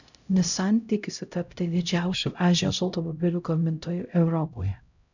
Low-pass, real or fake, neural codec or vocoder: 7.2 kHz; fake; codec, 16 kHz, 0.5 kbps, X-Codec, HuBERT features, trained on LibriSpeech